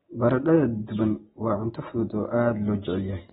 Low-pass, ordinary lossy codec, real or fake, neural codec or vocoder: 19.8 kHz; AAC, 16 kbps; real; none